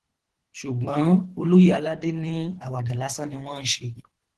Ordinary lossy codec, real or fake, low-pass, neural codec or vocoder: Opus, 16 kbps; fake; 10.8 kHz; codec, 24 kHz, 3 kbps, HILCodec